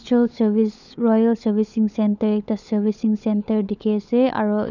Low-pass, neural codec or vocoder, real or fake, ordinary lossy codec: 7.2 kHz; codec, 16 kHz, 16 kbps, FunCodec, trained on LibriTTS, 50 frames a second; fake; none